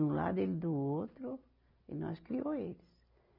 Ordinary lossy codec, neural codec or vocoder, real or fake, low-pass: none; none; real; 5.4 kHz